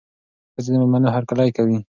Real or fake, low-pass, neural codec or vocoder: real; 7.2 kHz; none